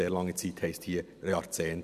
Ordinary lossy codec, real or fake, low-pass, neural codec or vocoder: none; real; 14.4 kHz; none